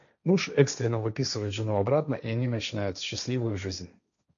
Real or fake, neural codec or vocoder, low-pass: fake; codec, 16 kHz, 1.1 kbps, Voila-Tokenizer; 7.2 kHz